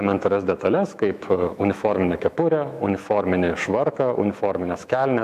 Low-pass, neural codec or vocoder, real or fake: 14.4 kHz; autoencoder, 48 kHz, 128 numbers a frame, DAC-VAE, trained on Japanese speech; fake